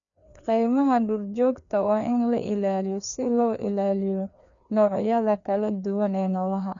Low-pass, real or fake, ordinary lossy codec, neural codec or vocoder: 7.2 kHz; fake; none; codec, 16 kHz, 2 kbps, FreqCodec, larger model